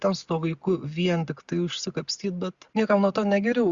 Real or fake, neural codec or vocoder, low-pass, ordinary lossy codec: real; none; 7.2 kHz; Opus, 64 kbps